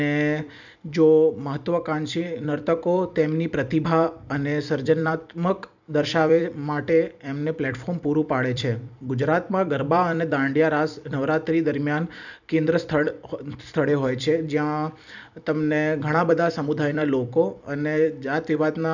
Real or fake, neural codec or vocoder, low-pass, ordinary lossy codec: fake; vocoder, 44.1 kHz, 128 mel bands every 256 samples, BigVGAN v2; 7.2 kHz; none